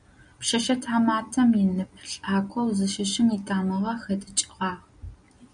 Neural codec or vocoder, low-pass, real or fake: none; 9.9 kHz; real